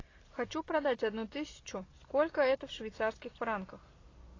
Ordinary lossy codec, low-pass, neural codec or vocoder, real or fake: AAC, 32 kbps; 7.2 kHz; none; real